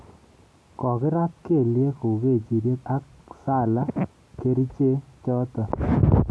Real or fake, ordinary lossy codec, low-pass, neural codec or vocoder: real; none; none; none